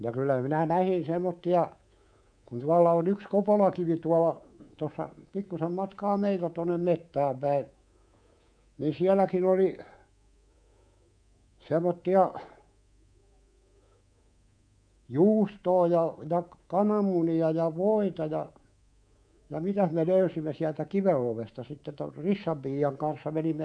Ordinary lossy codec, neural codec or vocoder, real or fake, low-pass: none; codec, 24 kHz, 3.1 kbps, DualCodec; fake; 9.9 kHz